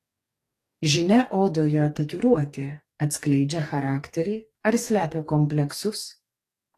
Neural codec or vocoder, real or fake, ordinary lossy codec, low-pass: codec, 44.1 kHz, 2.6 kbps, DAC; fake; AAC, 48 kbps; 14.4 kHz